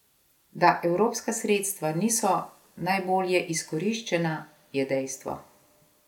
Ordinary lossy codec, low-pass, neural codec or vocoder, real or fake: none; 19.8 kHz; none; real